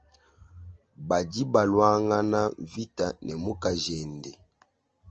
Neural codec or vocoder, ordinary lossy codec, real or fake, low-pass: none; Opus, 24 kbps; real; 7.2 kHz